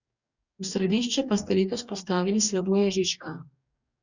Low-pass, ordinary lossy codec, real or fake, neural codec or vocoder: 7.2 kHz; none; fake; codec, 44.1 kHz, 2.6 kbps, DAC